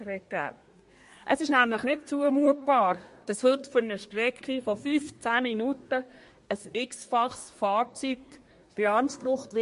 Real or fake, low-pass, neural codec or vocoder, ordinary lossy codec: fake; 10.8 kHz; codec, 24 kHz, 1 kbps, SNAC; MP3, 48 kbps